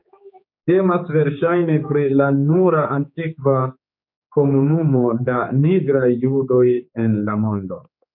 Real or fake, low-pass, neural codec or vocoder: fake; 5.4 kHz; codec, 16 kHz, 4 kbps, X-Codec, HuBERT features, trained on general audio